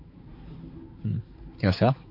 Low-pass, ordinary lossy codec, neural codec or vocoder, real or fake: 5.4 kHz; MP3, 32 kbps; codec, 16 kHz, 4 kbps, X-Codec, HuBERT features, trained on balanced general audio; fake